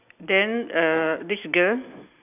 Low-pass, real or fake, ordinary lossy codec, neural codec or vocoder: 3.6 kHz; real; none; none